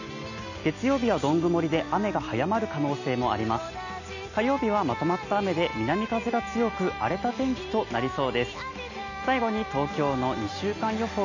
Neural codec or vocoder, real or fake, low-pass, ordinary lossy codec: none; real; 7.2 kHz; none